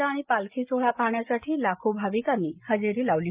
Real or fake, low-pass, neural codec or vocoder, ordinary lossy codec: real; 3.6 kHz; none; Opus, 24 kbps